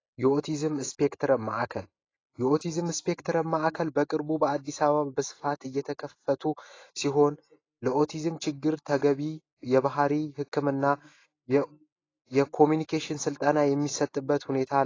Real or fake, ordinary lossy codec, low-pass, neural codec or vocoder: real; AAC, 32 kbps; 7.2 kHz; none